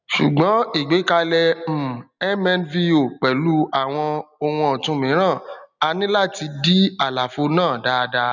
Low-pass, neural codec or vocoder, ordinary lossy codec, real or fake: 7.2 kHz; none; none; real